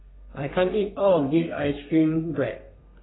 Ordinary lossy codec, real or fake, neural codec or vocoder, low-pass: AAC, 16 kbps; fake; codec, 32 kHz, 1.9 kbps, SNAC; 7.2 kHz